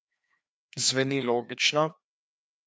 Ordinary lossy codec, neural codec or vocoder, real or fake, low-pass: none; codec, 16 kHz, 2 kbps, FreqCodec, larger model; fake; none